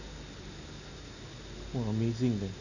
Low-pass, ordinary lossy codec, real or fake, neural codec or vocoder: 7.2 kHz; none; real; none